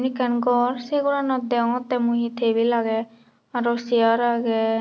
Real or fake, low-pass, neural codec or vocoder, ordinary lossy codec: real; none; none; none